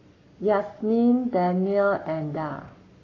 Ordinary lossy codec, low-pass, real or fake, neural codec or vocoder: AAC, 32 kbps; 7.2 kHz; fake; codec, 44.1 kHz, 7.8 kbps, Pupu-Codec